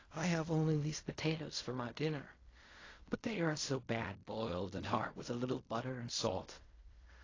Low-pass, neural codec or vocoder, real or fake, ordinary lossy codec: 7.2 kHz; codec, 16 kHz in and 24 kHz out, 0.4 kbps, LongCat-Audio-Codec, fine tuned four codebook decoder; fake; AAC, 32 kbps